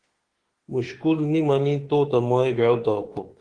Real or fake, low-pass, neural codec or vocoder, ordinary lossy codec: fake; 9.9 kHz; autoencoder, 48 kHz, 32 numbers a frame, DAC-VAE, trained on Japanese speech; Opus, 16 kbps